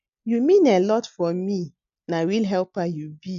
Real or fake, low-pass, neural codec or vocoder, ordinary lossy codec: real; 7.2 kHz; none; none